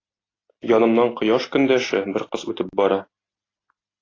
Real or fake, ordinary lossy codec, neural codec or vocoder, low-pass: real; AAC, 32 kbps; none; 7.2 kHz